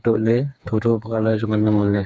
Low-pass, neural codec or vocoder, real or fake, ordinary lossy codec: none; codec, 16 kHz, 4 kbps, FreqCodec, smaller model; fake; none